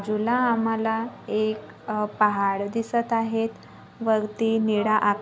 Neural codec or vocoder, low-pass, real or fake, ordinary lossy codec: none; none; real; none